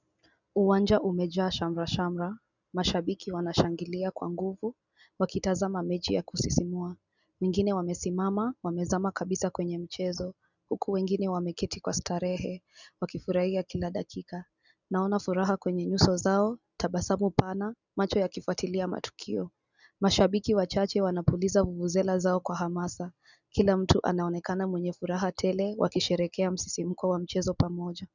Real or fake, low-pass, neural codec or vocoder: real; 7.2 kHz; none